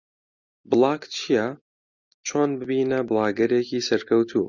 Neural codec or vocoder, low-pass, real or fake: none; 7.2 kHz; real